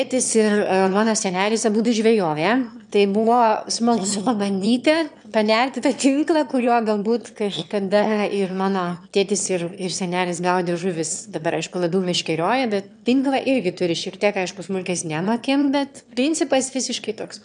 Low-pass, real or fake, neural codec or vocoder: 9.9 kHz; fake; autoencoder, 22.05 kHz, a latent of 192 numbers a frame, VITS, trained on one speaker